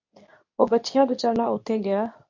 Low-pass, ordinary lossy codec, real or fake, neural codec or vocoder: 7.2 kHz; MP3, 48 kbps; fake; codec, 24 kHz, 0.9 kbps, WavTokenizer, medium speech release version 2